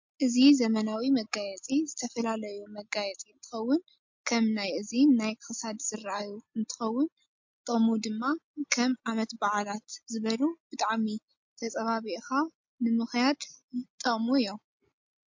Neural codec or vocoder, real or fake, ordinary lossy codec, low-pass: none; real; MP3, 48 kbps; 7.2 kHz